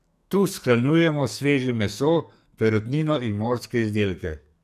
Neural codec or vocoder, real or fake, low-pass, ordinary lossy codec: codec, 44.1 kHz, 2.6 kbps, SNAC; fake; 14.4 kHz; none